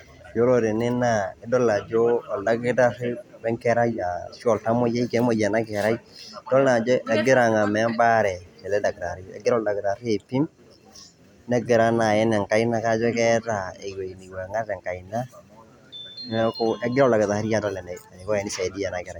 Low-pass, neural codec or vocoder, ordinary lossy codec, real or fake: 19.8 kHz; none; none; real